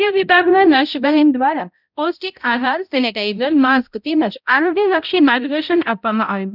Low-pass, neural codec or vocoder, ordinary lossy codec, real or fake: 5.4 kHz; codec, 16 kHz, 0.5 kbps, X-Codec, HuBERT features, trained on balanced general audio; none; fake